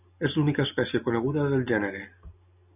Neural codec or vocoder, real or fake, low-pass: none; real; 3.6 kHz